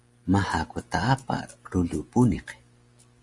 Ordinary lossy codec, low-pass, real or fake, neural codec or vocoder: Opus, 32 kbps; 10.8 kHz; real; none